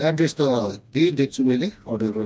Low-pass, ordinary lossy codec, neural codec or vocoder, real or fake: none; none; codec, 16 kHz, 1 kbps, FreqCodec, smaller model; fake